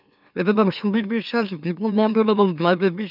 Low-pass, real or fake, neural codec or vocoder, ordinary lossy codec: 5.4 kHz; fake; autoencoder, 44.1 kHz, a latent of 192 numbers a frame, MeloTTS; none